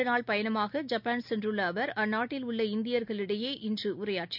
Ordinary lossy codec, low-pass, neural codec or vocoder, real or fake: none; 5.4 kHz; none; real